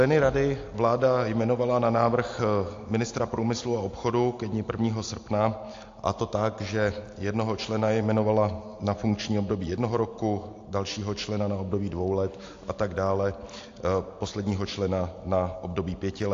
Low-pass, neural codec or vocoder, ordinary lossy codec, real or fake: 7.2 kHz; none; AAC, 48 kbps; real